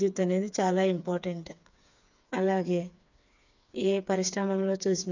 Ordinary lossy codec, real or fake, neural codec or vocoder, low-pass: none; fake; codec, 16 kHz, 4 kbps, FreqCodec, smaller model; 7.2 kHz